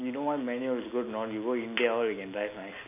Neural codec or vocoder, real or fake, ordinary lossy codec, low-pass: none; real; none; 3.6 kHz